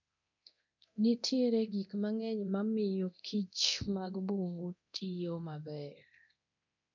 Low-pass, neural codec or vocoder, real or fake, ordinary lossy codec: 7.2 kHz; codec, 24 kHz, 0.9 kbps, DualCodec; fake; none